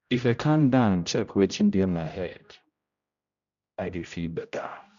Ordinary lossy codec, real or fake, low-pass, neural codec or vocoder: none; fake; 7.2 kHz; codec, 16 kHz, 0.5 kbps, X-Codec, HuBERT features, trained on general audio